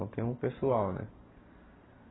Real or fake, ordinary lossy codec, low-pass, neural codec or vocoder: real; AAC, 16 kbps; 7.2 kHz; none